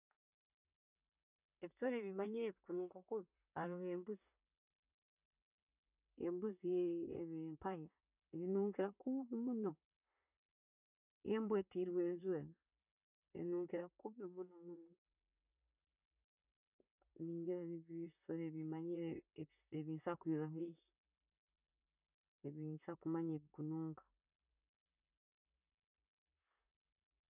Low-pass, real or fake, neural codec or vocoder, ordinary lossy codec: 3.6 kHz; fake; vocoder, 44.1 kHz, 128 mel bands, Pupu-Vocoder; none